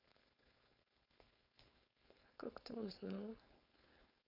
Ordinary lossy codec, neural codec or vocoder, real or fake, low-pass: none; codec, 16 kHz, 4.8 kbps, FACodec; fake; 5.4 kHz